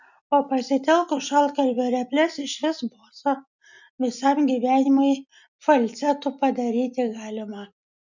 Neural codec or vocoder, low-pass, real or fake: none; 7.2 kHz; real